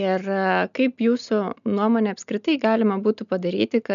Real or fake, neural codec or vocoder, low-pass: real; none; 7.2 kHz